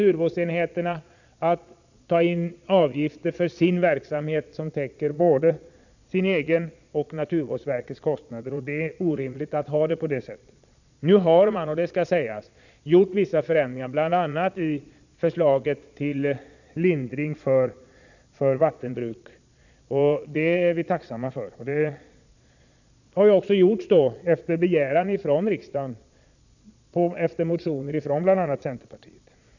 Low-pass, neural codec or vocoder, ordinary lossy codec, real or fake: 7.2 kHz; vocoder, 22.05 kHz, 80 mel bands, Vocos; none; fake